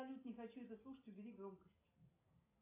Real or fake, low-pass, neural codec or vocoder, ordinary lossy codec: real; 3.6 kHz; none; AAC, 16 kbps